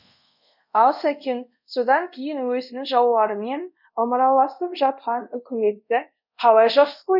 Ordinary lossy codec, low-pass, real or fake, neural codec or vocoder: none; 5.4 kHz; fake; codec, 24 kHz, 0.5 kbps, DualCodec